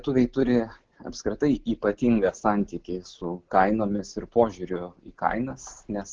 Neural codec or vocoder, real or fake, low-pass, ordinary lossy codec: none; real; 7.2 kHz; Opus, 16 kbps